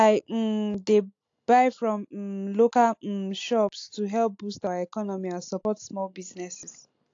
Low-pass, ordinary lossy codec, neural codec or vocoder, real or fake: 7.2 kHz; AAC, 48 kbps; none; real